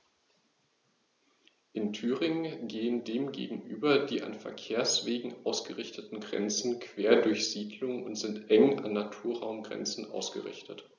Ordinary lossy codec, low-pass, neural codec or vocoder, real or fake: none; 7.2 kHz; none; real